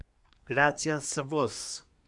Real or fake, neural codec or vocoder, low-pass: fake; codec, 24 kHz, 1 kbps, SNAC; 10.8 kHz